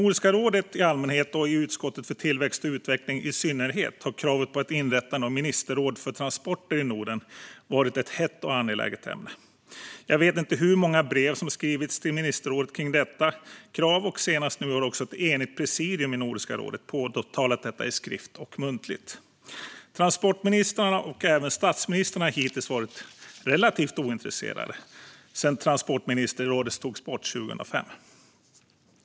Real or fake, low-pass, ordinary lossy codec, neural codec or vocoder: real; none; none; none